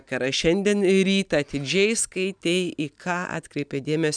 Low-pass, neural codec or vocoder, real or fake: 9.9 kHz; none; real